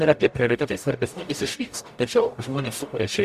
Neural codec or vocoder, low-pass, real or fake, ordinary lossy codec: codec, 44.1 kHz, 0.9 kbps, DAC; 14.4 kHz; fake; Opus, 64 kbps